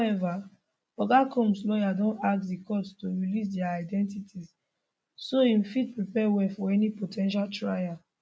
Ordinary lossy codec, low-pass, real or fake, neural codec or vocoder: none; none; real; none